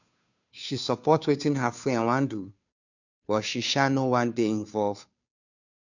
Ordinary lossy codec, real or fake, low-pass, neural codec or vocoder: none; fake; 7.2 kHz; codec, 16 kHz, 2 kbps, FunCodec, trained on Chinese and English, 25 frames a second